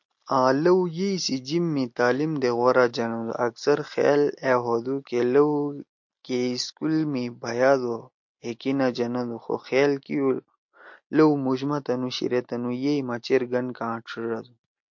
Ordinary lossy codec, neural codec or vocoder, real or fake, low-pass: MP3, 48 kbps; none; real; 7.2 kHz